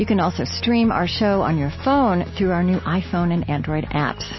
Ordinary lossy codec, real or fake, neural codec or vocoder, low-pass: MP3, 24 kbps; real; none; 7.2 kHz